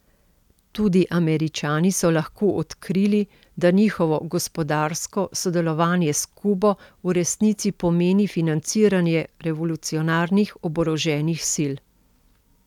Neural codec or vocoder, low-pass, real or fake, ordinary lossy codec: none; 19.8 kHz; real; none